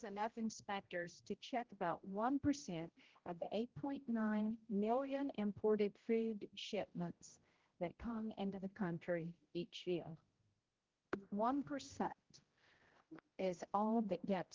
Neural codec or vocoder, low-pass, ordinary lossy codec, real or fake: codec, 16 kHz, 0.5 kbps, X-Codec, HuBERT features, trained on general audio; 7.2 kHz; Opus, 16 kbps; fake